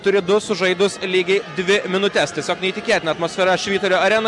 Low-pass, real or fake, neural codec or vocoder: 10.8 kHz; real; none